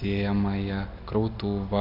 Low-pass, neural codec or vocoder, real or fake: 5.4 kHz; none; real